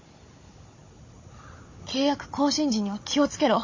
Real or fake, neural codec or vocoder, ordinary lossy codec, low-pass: fake; codec, 16 kHz, 16 kbps, FunCodec, trained on Chinese and English, 50 frames a second; MP3, 32 kbps; 7.2 kHz